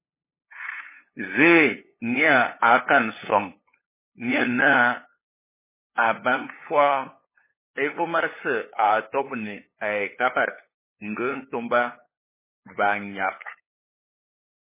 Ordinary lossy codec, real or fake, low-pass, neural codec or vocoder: MP3, 16 kbps; fake; 3.6 kHz; codec, 16 kHz, 8 kbps, FunCodec, trained on LibriTTS, 25 frames a second